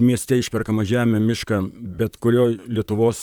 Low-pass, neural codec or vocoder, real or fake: 19.8 kHz; vocoder, 44.1 kHz, 128 mel bands, Pupu-Vocoder; fake